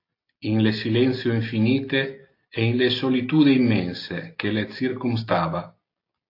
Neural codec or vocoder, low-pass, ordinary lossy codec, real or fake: none; 5.4 kHz; AAC, 32 kbps; real